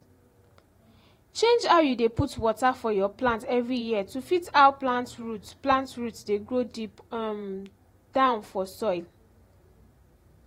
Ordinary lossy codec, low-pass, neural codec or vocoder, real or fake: AAC, 48 kbps; 19.8 kHz; vocoder, 44.1 kHz, 128 mel bands every 512 samples, BigVGAN v2; fake